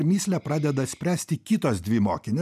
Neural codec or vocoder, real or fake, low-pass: none; real; 14.4 kHz